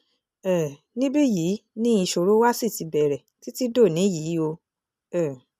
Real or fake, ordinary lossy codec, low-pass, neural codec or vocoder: real; none; 14.4 kHz; none